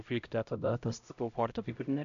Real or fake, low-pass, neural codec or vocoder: fake; 7.2 kHz; codec, 16 kHz, 0.5 kbps, X-Codec, HuBERT features, trained on LibriSpeech